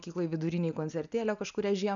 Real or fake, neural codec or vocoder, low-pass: real; none; 7.2 kHz